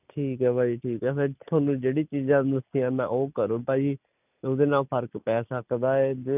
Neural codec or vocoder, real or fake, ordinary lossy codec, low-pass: none; real; none; 3.6 kHz